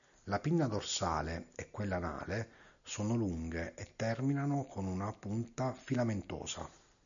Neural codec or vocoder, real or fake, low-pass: none; real; 7.2 kHz